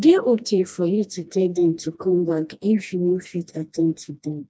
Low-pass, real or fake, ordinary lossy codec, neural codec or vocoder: none; fake; none; codec, 16 kHz, 1 kbps, FreqCodec, smaller model